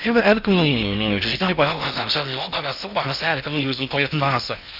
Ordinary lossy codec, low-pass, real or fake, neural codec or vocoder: Opus, 64 kbps; 5.4 kHz; fake; codec, 16 kHz in and 24 kHz out, 0.6 kbps, FocalCodec, streaming, 4096 codes